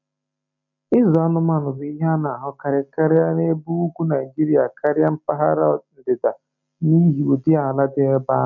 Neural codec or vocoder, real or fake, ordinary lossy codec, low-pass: none; real; none; 7.2 kHz